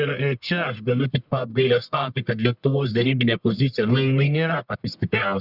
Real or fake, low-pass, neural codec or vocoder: fake; 5.4 kHz; codec, 44.1 kHz, 1.7 kbps, Pupu-Codec